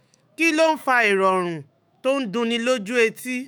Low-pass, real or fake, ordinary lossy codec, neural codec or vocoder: none; fake; none; autoencoder, 48 kHz, 128 numbers a frame, DAC-VAE, trained on Japanese speech